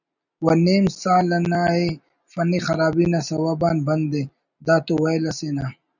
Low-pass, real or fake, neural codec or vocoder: 7.2 kHz; real; none